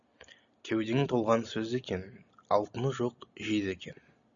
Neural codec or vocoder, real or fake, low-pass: none; real; 7.2 kHz